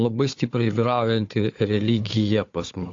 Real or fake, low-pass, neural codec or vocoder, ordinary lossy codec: fake; 7.2 kHz; codec, 16 kHz, 4 kbps, FunCodec, trained on Chinese and English, 50 frames a second; AAC, 48 kbps